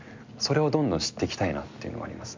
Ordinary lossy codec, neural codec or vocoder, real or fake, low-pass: MP3, 64 kbps; none; real; 7.2 kHz